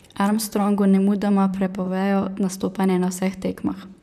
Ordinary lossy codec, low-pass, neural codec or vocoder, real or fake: none; 14.4 kHz; vocoder, 44.1 kHz, 128 mel bands, Pupu-Vocoder; fake